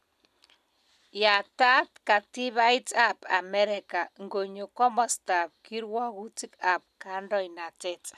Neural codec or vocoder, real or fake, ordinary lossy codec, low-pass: none; real; none; 14.4 kHz